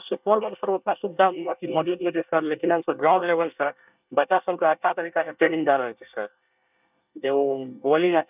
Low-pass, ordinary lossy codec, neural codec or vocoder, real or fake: 3.6 kHz; none; codec, 24 kHz, 1 kbps, SNAC; fake